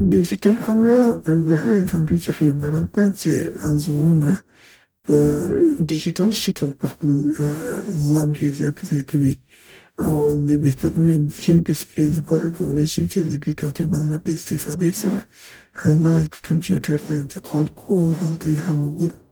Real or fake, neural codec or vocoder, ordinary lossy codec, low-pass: fake; codec, 44.1 kHz, 0.9 kbps, DAC; none; none